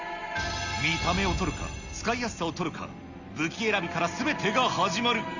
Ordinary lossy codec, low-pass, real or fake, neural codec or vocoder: Opus, 64 kbps; 7.2 kHz; real; none